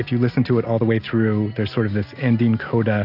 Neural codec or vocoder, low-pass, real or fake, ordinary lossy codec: none; 5.4 kHz; real; AAC, 48 kbps